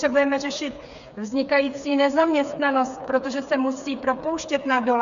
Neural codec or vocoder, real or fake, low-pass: codec, 16 kHz, 4 kbps, FreqCodec, smaller model; fake; 7.2 kHz